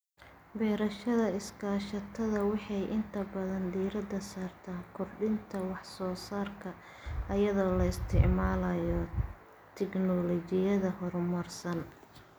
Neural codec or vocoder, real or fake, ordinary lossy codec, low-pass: none; real; none; none